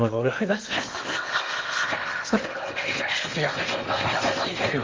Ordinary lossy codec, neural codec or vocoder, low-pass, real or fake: Opus, 32 kbps; codec, 16 kHz in and 24 kHz out, 0.8 kbps, FocalCodec, streaming, 65536 codes; 7.2 kHz; fake